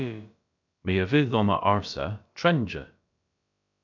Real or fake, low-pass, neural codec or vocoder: fake; 7.2 kHz; codec, 16 kHz, about 1 kbps, DyCAST, with the encoder's durations